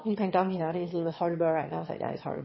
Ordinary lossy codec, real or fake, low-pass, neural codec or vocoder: MP3, 24 kbps; fake; 7.2 kHz; autoencoder, 22.05 kHz, a latent of 192 numbers a frame, VITS, trained on one speaker